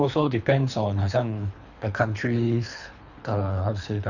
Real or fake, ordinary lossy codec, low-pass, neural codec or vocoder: fake; none; 7.2 kHz; codec, 24 kHz, 3 kbps, HILCodec